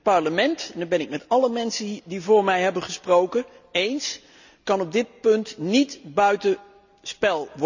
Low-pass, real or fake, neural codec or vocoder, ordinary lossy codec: 7.2 kHz; real; none; none